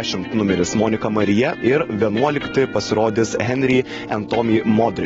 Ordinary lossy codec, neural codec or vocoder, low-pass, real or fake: AAC, 24 kbps; none; 7.2 kHz; real